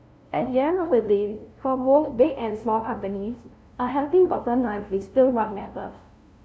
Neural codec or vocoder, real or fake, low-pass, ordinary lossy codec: codec, 16 kHz, 0.5 kbps, FunCodec, trained on LibriTTS, 25 frames a second; fake; none; none